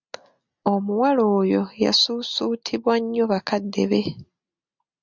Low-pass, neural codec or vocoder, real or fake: 7.2 kHz; none; real